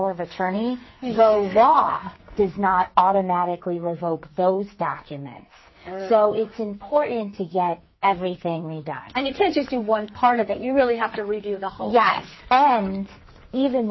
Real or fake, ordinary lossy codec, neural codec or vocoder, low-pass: fake; MP3, 24 kbps; codec, 44.1 kHz, 2.6 kbps, SNAC; 7.2 kHz